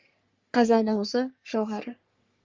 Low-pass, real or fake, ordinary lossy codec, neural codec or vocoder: 7.2 kHz; fake; Opus, 32 kbps; codec, 44.1 kHz, 3.4 kbps, Pupu-Codec